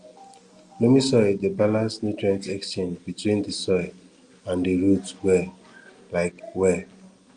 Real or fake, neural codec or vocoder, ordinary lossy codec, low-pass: real; none; Opus, 32 kbps; 9.9 kHz